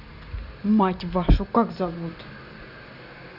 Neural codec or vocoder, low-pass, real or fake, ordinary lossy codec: none; 5.4 kHz; real; none